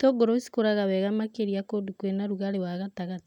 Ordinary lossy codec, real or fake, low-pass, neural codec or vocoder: none; real; 19.8 kHz; none